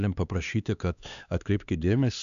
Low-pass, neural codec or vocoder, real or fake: 7.2 kHz; codec, 16 kHz, 2 kbps, X-Codec, HuBERT features, trained on LibriSpeech; fake